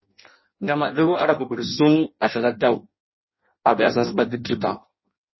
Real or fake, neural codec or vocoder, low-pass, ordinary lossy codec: fake; codec, 16 kHz in and 24 kHz out, 0.6 kbps, FireRedTTS-2 codec; 7.2 kHz; MP3, 24 kbps